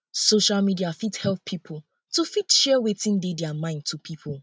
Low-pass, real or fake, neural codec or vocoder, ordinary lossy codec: none; real; none; none